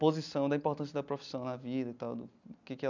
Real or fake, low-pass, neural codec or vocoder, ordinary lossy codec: real; 7.2 kHz; none; none